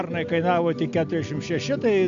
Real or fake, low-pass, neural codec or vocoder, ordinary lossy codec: real; 7.2 kHz; none; MP3, 64 kbps